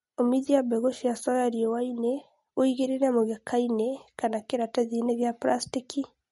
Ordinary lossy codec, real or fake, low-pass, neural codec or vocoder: MP3, 48 kbps; real; 19.8 kHz; none